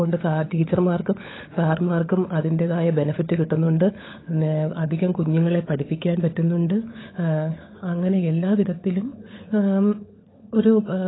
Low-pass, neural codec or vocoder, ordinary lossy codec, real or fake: 7.2 kHz; codec, 16 kHz, 16 kbps, FunCodec, trained on LibriTTS, 50 frames a second; AAC, 16 kbps; fake